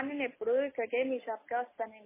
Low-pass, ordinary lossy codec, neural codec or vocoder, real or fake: 3.6 kHz; MP3, 16 kbps; none; real